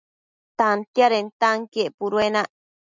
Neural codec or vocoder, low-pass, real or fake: none; 7.2 kHz; real